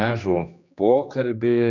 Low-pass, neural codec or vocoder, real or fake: 7.2 kHz; codec, 16 kHz, 2 kbps, X-Codec, HuBERT features, trained on general audio; fake